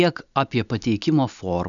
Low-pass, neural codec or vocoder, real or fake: 7.2 kHz; none; real